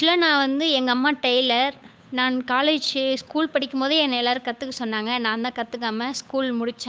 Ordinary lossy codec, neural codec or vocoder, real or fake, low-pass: none; codec, 16 kHz, 8 kbps, FunCodec, trained on Chinese and English, 25 frames a second; fake; none